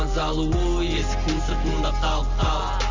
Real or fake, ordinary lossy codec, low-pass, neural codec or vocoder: real; MP3, 64 kbps; 7.2 kHz; none